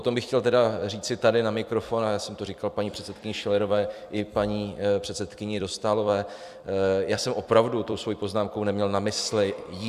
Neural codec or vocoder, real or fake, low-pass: vocoder, 44.1 kHz, 128 mel bands every 256 samples, BigVGAN v2; fake; 14.4 kHz